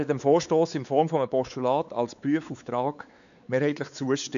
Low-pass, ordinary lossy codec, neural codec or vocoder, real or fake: 7.2 kHz; none; codec, 16 kHz, 4 kbps, X-Codec, WavLM features, trained on Multilingual LibriSpeech; fake